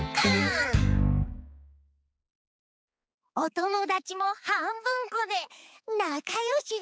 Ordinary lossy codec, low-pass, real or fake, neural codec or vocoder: none; none; fake; codec, 16 kHz, 4 kbps, X-Codec, HuBERT features, trained on balanced general audio